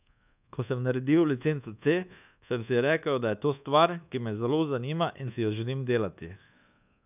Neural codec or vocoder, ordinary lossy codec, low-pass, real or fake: codec, 24 kHz, 1.2 kbps, DualCodec; none; 3.6 kHz; fake